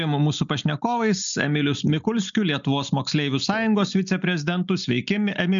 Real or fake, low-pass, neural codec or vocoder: real; 7.2 kHz; none